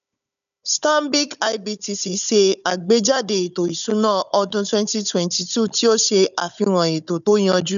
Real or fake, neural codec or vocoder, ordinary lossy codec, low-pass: fake; codec, 16 kHz, 16 kbps, FunCodec, trained on Chinese and English, 50 frames a second; none; 7.2 kHz